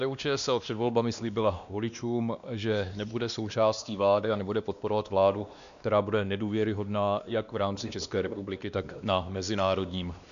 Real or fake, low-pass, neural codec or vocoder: fake; 7.2 kHz; codec, 16 kHz, 2 kbps, X-Codec, WavLM features, trained on Multilingual LibriSpeech